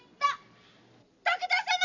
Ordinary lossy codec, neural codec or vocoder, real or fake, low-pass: none; vocoder, 44.1 kHz, 128 mel bands every 256 samples, BigVGAN v2; fake; 7.2 kHz